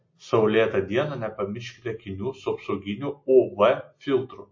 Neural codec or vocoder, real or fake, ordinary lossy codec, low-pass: none; real; MP3, 32 kbps; 7.2 kHz